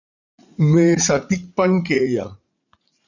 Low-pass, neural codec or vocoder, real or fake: 7.2 kHz; vocoder, 44.1 kHz, 80 mel bands, Vocos; fake